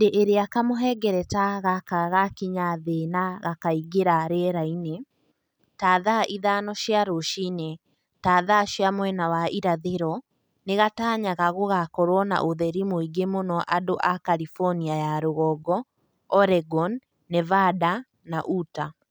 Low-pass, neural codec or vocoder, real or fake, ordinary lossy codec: none; none; real; none